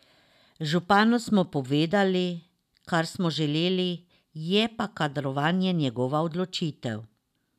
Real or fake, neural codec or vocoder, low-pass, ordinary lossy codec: real; none; 14.4 kHz; none